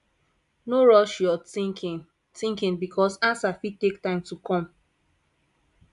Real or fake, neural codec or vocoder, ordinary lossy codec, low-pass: real; none; AAC, 96 kbps; 10.8 kHz